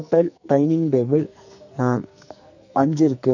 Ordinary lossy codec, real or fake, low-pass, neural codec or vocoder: none; fake; 7.2 kHz; codec, 44.1 kHz, 2.6 kbps, SNAC